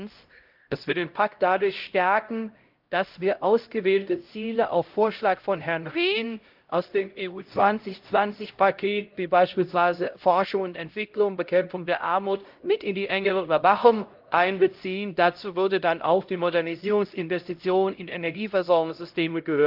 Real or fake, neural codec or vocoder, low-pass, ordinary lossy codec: fake; codec, 16 kHz, 0.5 kbps, X-Codec, HuBERT features, trained on LibriSpeech; 5.4 kHz; Opus, 32 kbps